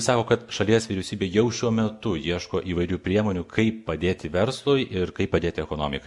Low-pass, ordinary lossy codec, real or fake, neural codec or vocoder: 10.8 kHz; MP3, 64 kbps; real; none